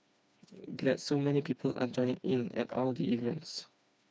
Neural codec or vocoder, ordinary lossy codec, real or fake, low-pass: codec, 16 kHz, 2 kbps, FreqCodec, smaller model; none; fake; none